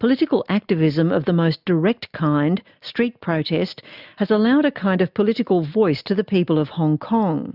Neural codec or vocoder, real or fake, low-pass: none; real; 5.4 kHz